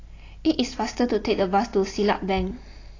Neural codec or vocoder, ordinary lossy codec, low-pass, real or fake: none; AAC, 32 kbps; 7.2 kHz; real